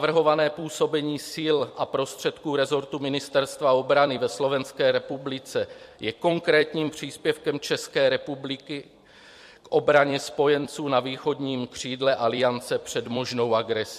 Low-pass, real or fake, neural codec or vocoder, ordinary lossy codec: 14.4 kHz; fake; vocoder, 44.1 kHz, 128 mel bands every 256 samples, BigVGAN v2; MP3, 64 kbps